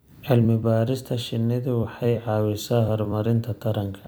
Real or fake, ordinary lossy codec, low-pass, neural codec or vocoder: fake; none; none; vocoder, 44.1 kHz, 128 mel bands every 256 samples, BigVGAN v2